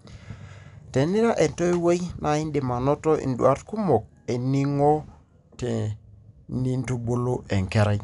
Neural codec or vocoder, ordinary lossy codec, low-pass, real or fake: none; none; 10.8 kHz; real